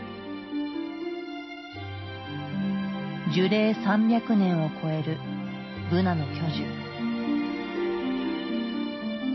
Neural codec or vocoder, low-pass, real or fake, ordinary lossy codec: none; 7.2 kHz; real; MP3, 24 kbps